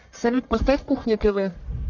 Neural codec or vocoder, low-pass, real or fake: codec, 44.1 kHz, 1.7 kbps, Pupu-Codec; 7.2 kHz; fake